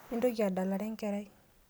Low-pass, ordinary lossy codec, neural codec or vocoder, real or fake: none; none; none; real